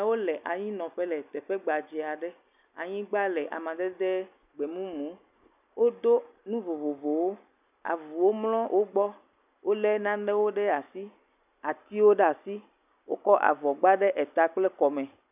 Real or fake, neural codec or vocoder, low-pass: real; none; 3.6 kHz